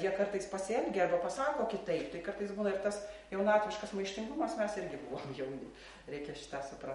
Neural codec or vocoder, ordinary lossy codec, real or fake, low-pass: none; MP3, 48 kbps; real; 19.8 kHz